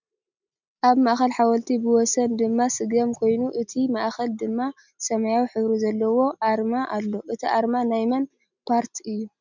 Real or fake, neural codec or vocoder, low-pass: real; none; 7.2 kHz